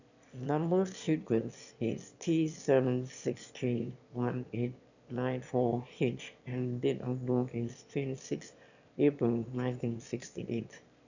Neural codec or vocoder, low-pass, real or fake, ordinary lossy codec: autoencoder, 22.05 kHz, a latent of 192 numbers a frame, VITS, trained on one speaker; 7.2 kHz; fake; none